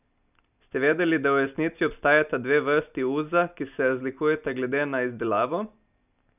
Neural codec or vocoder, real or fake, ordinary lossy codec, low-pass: none; real; none; 3.6 kHz